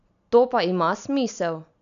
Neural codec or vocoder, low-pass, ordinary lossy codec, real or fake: none; 7.2 kHz; none; real